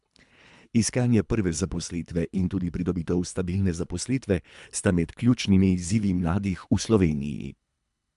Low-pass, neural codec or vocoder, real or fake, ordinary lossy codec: 10.8 kHz; codec, 24 kHz, 3 kbps, HILCodec; fake; MP3, 96 kbps